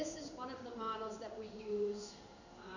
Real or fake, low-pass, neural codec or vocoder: fake; 7.2 kHz; codec, 16 kHz in and 24 kHz out, 1 kbps, XY-Tokenizer